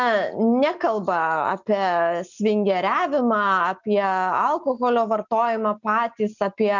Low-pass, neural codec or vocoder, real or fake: 7.2 kHz; none; real